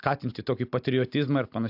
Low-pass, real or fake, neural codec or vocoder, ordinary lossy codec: 5.4 kHz; real; none; AAC, 48 kbps